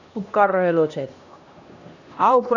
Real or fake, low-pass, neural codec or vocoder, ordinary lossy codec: fake; 7.2 kHz; codec, 16 kHz, 1 kbps, X-Codec, HuBERT features, trained on LibriSpeech; none